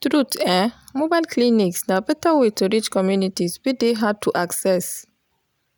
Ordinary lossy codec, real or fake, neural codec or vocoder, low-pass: none; real; none; none